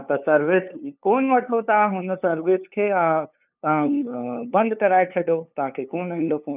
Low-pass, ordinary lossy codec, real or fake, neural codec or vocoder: 3.6 kHz; none; fake; codec, 16 kHz, 2 kbps, FunCodec, trained on LibriTTS, 25 frames a second